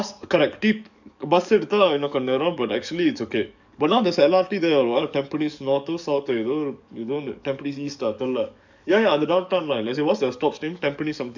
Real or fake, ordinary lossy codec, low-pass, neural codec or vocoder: fake; none; 7.2 kHz; codec, 44.1 kHz, 7.8 kbps, DAC